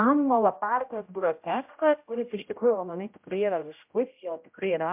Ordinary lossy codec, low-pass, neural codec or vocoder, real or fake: MP3, 32 kbps; 3.6 kHz; codec, 16 kHz, 0.5 kbps, X-Codec, HuBERT features, trained on general audio; fake